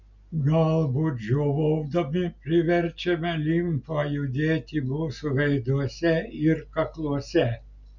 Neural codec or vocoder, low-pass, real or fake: none; 7.2 kHz; real